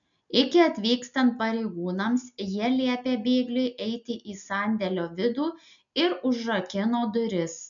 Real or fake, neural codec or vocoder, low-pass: real; none; 7.2 kHz